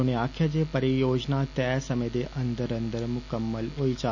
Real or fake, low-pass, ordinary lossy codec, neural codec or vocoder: real; 7.2 kHz; none; none